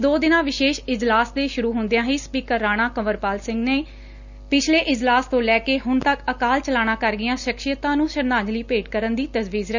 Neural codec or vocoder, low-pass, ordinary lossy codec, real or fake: none; 7.2 kHz; none; real